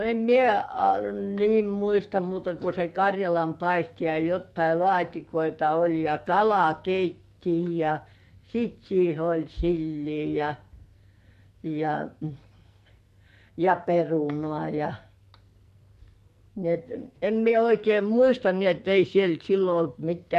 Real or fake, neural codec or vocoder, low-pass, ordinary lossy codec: fake; codec, 44.1 kHz, 2.6 kbps, SNAC; 14.4 kHz; MP3, 64 kbps